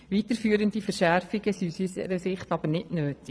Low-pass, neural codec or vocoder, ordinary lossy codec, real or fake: none; vocoder, 22.05 kHz, 80 mel bands, Vocos; none; fake